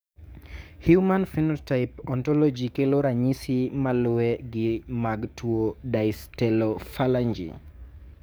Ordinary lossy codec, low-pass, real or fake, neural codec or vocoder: none; none; fake; codec, 44.1 kHz, 7.8 kbps, DAC